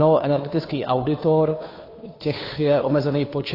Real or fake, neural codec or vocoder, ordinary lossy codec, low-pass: fake; codec, 16 kHz, 2 kbps, FunCodec, trained on Chinese and English, 25 frames a second; AAC, 24 kbps; 5.4 kHz